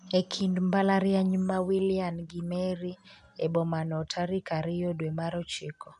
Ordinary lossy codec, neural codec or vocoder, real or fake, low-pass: none; none; real; 10.8 kHz